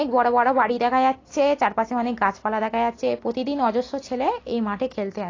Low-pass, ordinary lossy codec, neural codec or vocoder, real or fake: 7.2 kHz; AAC, 32 kbps; none; real